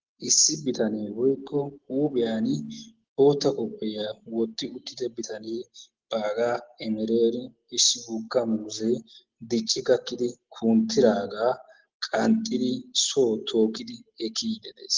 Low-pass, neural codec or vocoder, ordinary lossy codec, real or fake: 7.2 kHz; none; Opus, 16 kbps; real